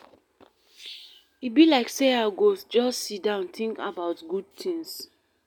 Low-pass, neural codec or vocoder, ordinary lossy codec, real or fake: 19.8 kHz; none; none; real